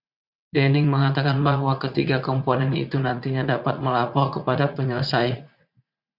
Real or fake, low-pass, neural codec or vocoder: fake; 5.4 kHz; vocoder, 44.1 kHz, 80 mel bands, Vocos